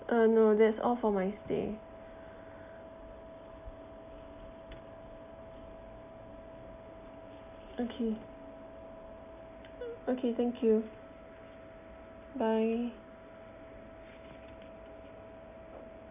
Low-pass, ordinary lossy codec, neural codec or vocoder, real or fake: 3.6 kHz; none; none; real